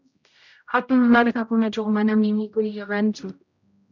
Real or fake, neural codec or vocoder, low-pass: fake; codec, 16 kHz, 0.5 kbps, X-Codec, HuBERT features, trained on general audio; 7.2 kHz